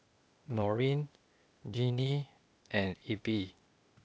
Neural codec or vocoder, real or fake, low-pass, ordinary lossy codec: codec, 16 kHz, 0.8 kbps, ZipCodec; fake; none; none